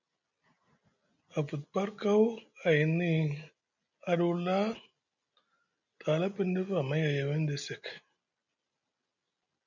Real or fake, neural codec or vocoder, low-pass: real; none; 7.2 kHz